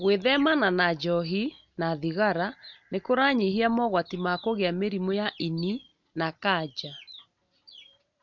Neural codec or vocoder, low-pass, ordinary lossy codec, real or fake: none; 7.2 kHz; none; real